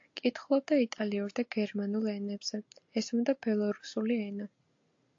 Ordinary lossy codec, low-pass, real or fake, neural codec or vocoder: AAC, 48 kbps; 7.2 kHz; real; none